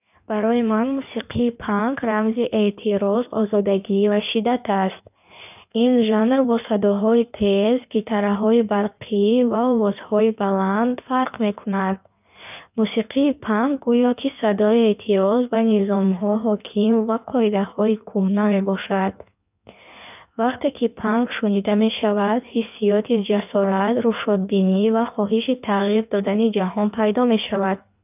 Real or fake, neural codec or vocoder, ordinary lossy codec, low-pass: fake; codec, 16 kHz in and 24 kHz out, 1.1 kbps, FireRedTTS-2 codec; none; 3.6 kHz